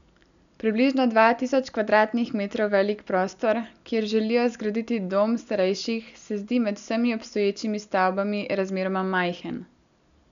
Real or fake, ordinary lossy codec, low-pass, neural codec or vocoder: real; none; 7.2 kHz; none